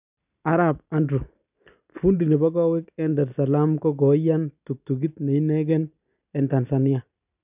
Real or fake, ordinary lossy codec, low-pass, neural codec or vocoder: real; none; 3.6 kHz; none